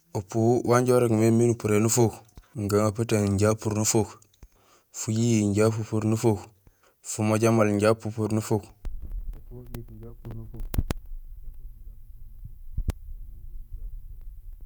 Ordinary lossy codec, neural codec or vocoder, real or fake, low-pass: none; vocoder, 48 kHz, 128 mel bands, Vocos; fake; none